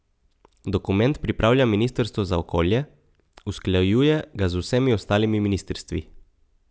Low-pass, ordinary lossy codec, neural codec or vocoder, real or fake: none; none; none; real